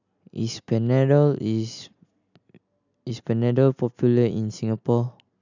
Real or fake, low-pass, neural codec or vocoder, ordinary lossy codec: real; 7.2 kHz; none; none